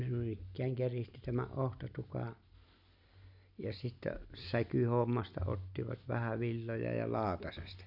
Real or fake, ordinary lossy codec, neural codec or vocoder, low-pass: real; none; none; 5.4 kHz